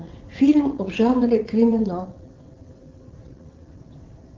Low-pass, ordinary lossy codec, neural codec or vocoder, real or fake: 7.2 kHz; Opus, 16 kbps; vocoder, 22.05 kHz, 80 mel bands, WaveNeXt; fake